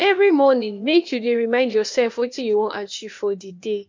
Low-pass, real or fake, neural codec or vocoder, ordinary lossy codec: 7.2 kHz; fake; codec, 16 kHz, 0.8 kbps, ZipCodec; MP3, 48 kbps